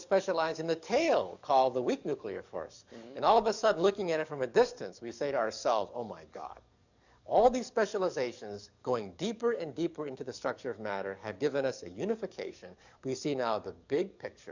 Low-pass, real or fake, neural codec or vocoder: 7.2 kHz; fake; codec, 44.1 kHz, 7.8 kbps, DAC